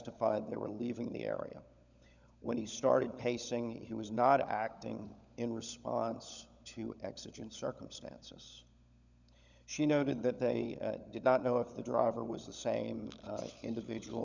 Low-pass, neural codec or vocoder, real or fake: 7.2 kHz; codec, 16 kHz, 16 kbps, FunCodec, trained on LibriTTS, 50 frames a second; fake